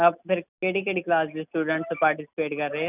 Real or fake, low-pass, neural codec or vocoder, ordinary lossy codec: real; 3.6 kHz; none; none